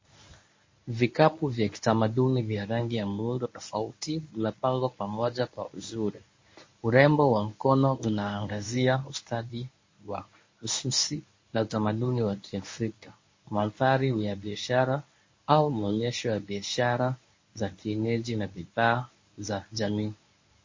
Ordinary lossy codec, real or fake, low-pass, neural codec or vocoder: MP3, 32 kbps; fake; 7.2 kHz; codec, 24 kHz, 0.9 kbps, WavTokenizer, medium speech release version 1